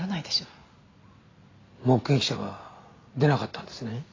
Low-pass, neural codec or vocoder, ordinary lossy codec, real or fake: 7.2 kHz; none; AAC, 32 kbps; real